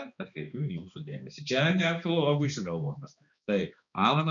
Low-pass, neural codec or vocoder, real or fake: 7.2 kHz; codec, 16 kHz, 2 kbps, X-Codec, HuBERT features, trained on balanced general audio; fake